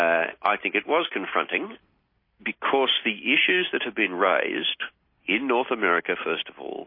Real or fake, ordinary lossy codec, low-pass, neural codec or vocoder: real; MP3, 24 kbps; 5.4 kHz; none